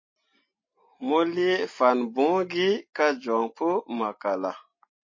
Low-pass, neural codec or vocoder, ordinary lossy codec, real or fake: 7.2 kHz; none; MP3, 32 kbps; real